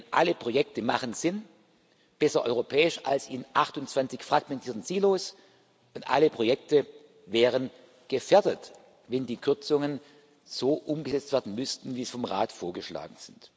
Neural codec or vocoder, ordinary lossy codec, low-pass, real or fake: none; none; none; real